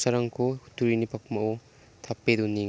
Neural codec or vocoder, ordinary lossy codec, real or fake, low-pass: none; none; real; none